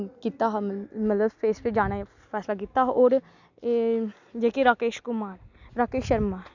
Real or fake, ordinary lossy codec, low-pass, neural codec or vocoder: real; none; 7.2 kHz; none